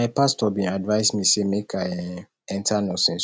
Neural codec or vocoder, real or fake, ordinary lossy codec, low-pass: none; real; none; none